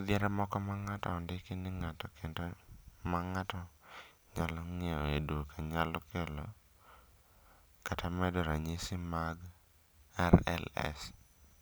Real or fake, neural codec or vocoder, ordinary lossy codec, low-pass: real; none; none; none